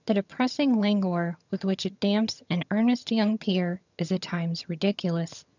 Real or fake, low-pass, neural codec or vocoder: fake; 7.2 kHz; vocoder, 22.05 kHz, 80 mel bands, HiFi-GAN